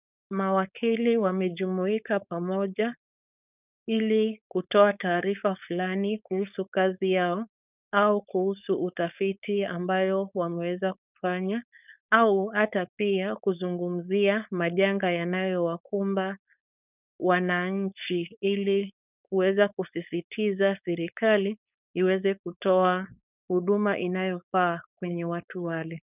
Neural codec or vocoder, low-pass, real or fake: codec, 16 kHz, 4.8 kbps, FACodec; 3.6 kHz; fake